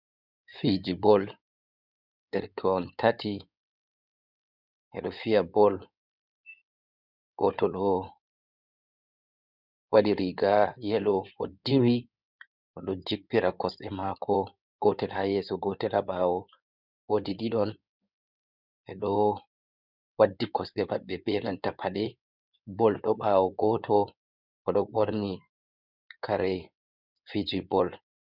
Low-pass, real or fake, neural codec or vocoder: 5.4 kHz; fake; codec, 16 kHz in and 24 kHz out, 2.2 kbps, FireRedTTS-2 codec